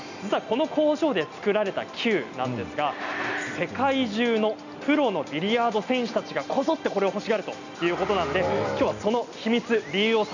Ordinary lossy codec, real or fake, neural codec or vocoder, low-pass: none; real; none; 7.2 kHz